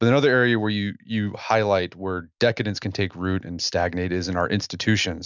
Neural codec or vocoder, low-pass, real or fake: none; 7.2 kHz; real